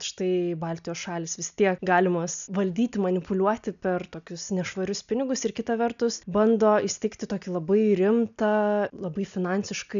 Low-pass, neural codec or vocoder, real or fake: 7.2 kHz; none; real